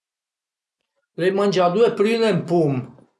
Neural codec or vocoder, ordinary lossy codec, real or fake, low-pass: none; none; real; none